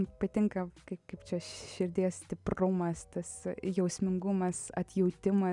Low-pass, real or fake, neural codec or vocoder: 10.8 kHz; real; none